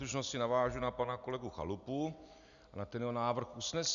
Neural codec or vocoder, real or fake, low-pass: none; real; 7.2 kHz